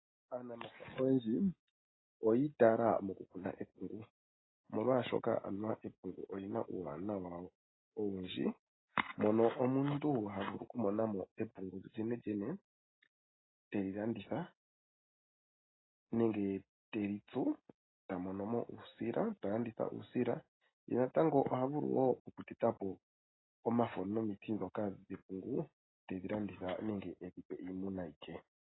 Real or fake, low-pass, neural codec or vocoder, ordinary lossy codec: real; 7.2 kHz; none; AAC, 16 kbps